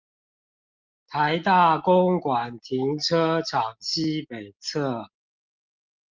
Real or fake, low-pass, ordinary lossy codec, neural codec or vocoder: real; 7.2 kHz; Opus, 32 kbps; none